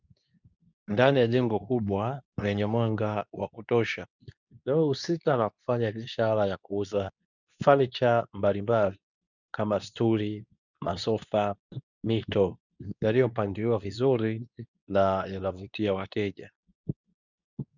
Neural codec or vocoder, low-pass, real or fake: codec, 24 kHz, 0.9 kbps, WavTokenizer, medium speech release version 2; 7.2 kHz; fake